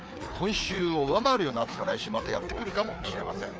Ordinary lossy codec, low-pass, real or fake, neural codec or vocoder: none; none; fake; codec, 16 kHz, 4 kbps, FreqCodec, larger model